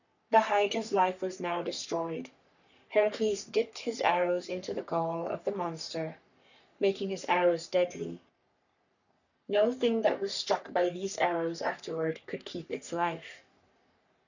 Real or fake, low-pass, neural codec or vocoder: fake; 7.2 kHz; codec, 44.1 kHz, 3.4 kbps, Pupu-Codec